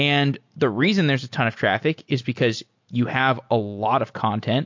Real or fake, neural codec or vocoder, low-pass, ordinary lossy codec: real; none; 7.2 kHz; MP3, 48 kbps